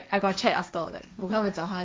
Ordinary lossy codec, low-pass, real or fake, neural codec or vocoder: none; none; fake; codec, 16 kHz, 1.1 kbps, Voila-Tokenizer